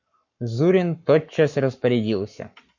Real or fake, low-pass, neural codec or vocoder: fake; 7.2 kHz; codec, 44.1 kHz, 7.8 kbps, Pupu-Codec